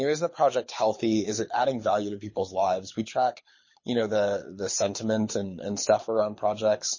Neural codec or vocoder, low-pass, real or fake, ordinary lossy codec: codec, 24 kHz, 6 kbps, HILCodec; 7.2 kHz; fake; MP3, 32 kbps